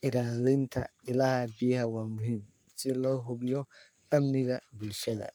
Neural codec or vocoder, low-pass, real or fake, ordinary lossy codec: codec, 44.1 kHz, 3.4 kbps, Pupu-Codec; none; fake; none